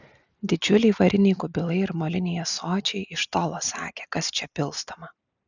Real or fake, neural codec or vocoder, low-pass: real; none; 7.2 kHz